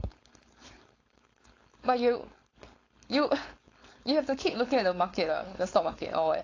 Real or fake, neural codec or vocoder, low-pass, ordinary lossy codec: fake; codec, 16 kHz, 4.8 kbps, FACodec; 7.2 kHz; AAC, 32 kbps